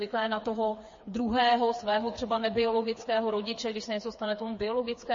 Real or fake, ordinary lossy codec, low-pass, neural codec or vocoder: fake; MP3, 32 kbps; 7.2 kHz; codec, 16 kHz, 4 kbps, FreqCodec, smaller model